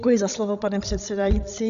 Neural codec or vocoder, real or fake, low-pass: codec, 16 kHz, 16 kbps, FreqCodec, larger model; fake; 7.2 kHz